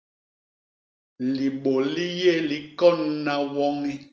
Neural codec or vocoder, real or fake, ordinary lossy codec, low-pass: none; real; Opus, 24 kbps; 7.2 kHz